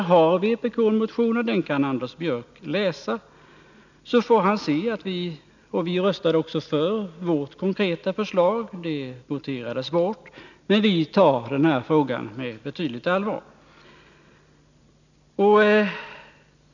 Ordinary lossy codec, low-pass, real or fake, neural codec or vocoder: none; 7.2 kHz; real; none